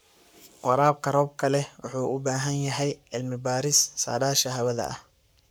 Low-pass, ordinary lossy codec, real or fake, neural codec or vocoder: none; none; fake; codec, 44.1 kHz, 7.8 kbps, Pupu-Codec